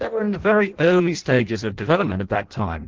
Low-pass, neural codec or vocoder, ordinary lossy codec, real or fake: 7.2 kHz; codec, 16 kHz in and 24 kHz out, 0.6 kbps, FireRedTTS-2 codec; Opus, 16 kbps; fake